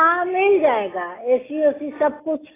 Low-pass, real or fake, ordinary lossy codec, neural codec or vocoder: 3.6 kHz; real; AAC, 16 kbps; none